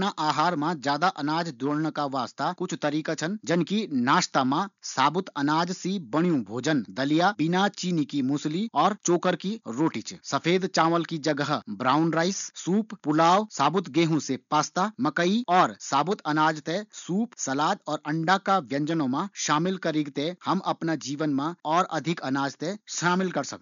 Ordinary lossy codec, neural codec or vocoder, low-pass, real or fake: none; none; 7.2 kHz; real